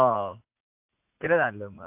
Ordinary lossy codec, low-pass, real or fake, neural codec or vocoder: AAC, 24 kbps; 3.6 kHz; fake; vocoder, 44.1 kHz, 80 mel bands, Vocos